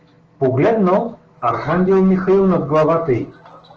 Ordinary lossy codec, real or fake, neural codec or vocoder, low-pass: Opus, 16 kbps; fake; autoencoder, 48 kHz, 128 numbers a frame, DAC-VAE, trained on Japanese speech; 7.2 kHz